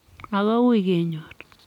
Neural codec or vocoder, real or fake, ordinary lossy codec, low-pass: none; real; none; 19.8 kHz